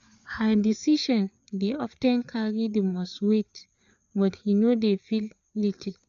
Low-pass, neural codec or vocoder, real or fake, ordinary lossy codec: 7.2 kHz; codec, 16 kHz, 4 kbps, FreqCodec, larger model; fake; none